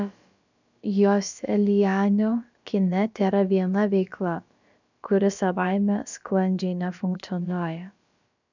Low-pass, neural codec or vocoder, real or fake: 7.2 kHz; codec, 16 kHz, about 1 kbps, DyCAST, with the encoder's durations; fake